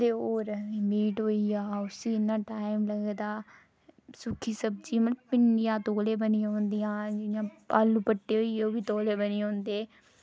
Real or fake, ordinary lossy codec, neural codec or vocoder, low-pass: real; none; none; none